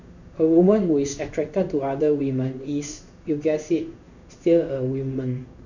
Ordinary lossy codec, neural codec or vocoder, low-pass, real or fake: none; codec, 16 kHz in and 24 kHz out, 1 kbps, XY-Tokenizer; 7.2 kHz; fake